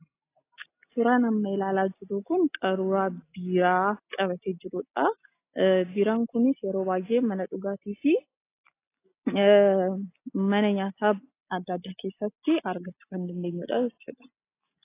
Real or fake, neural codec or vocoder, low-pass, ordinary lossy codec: real; none; 3.6 kHz; AAC, 24 kbps